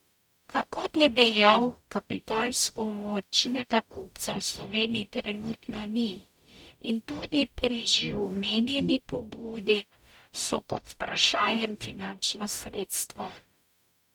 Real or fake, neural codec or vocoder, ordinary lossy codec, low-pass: fake; codec, 44.1 kHz, 0.9 kbps, DAC; none; 19.8 kHz